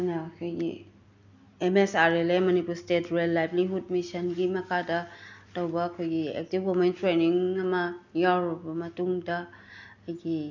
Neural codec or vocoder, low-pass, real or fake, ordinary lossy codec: none; 7.2 kHz; real; none